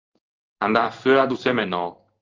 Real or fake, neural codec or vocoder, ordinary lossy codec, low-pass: fake; codec, 16 kHz in and 24 kHz out, 1 kbps, XY-Tokenizer; Opus, 32 kbps; 7.2 kHz